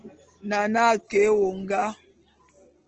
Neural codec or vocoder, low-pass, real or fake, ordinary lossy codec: none; 7.2 kHz; real; Opus, 16 kbps